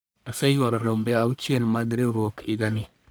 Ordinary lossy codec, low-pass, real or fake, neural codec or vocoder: none; none; fake; codec, 44.1 kHz, 1.7 kbps, Pupu-Codec